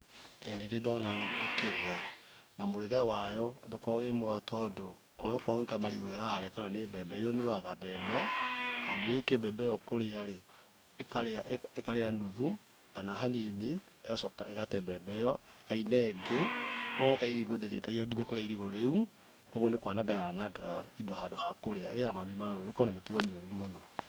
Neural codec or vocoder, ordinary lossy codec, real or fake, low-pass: codec, 44.1 kHz, 2.6 kbps, DAC; none; fake; none